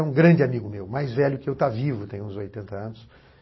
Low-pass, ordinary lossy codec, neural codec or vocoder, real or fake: 7.2 kHz; MP3, 24 kbps; none; real